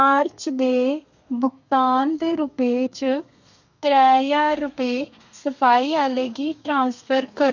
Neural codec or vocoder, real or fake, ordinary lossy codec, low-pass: codec, 32 kHz, 1.9 kbps, SNAC; fake; none; 7.2 kHz